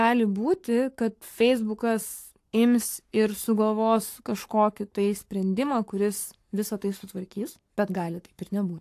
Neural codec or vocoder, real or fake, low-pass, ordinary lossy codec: codec, 44.1 kHz, 7.8 kbps, Pupu-Codec; fake; 14.4 kHz; AAC, 64 kbps